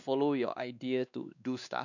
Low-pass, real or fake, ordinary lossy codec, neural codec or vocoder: 7.2 kHz; fake; none; codec, 16 kHz, 2 kbps, X-Codec, WavLM features, trained on Multilingual LibriSpeech